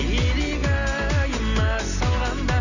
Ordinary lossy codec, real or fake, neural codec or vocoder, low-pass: none; real; none; 7.2 kHz